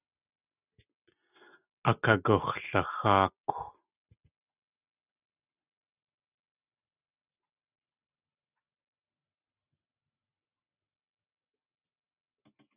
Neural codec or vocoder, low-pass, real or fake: none; 3.6 kHz; real